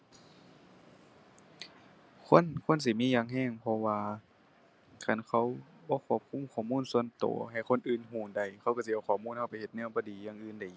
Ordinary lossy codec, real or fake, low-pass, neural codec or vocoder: none; real; none; none